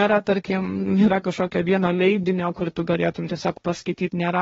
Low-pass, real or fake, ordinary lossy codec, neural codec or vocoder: 7.2 kHz; fake; AAC, 24 kbps; codec, 16 kHz, 1.1 kbps, Voila-Tokenizer